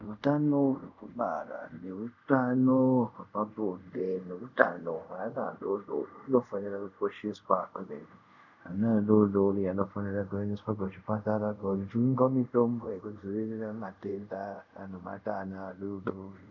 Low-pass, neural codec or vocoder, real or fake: 7.2 kHz; codec, 24 kHz, 0.5 kbps, DualCodec; fake